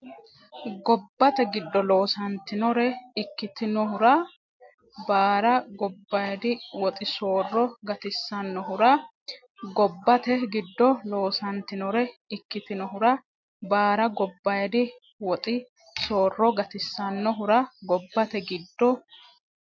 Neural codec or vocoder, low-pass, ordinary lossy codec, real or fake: none; 7.2 kHz; MP3, 48 kbps; real